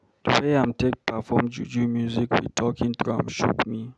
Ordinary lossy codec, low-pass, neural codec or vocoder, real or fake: none; none; none; real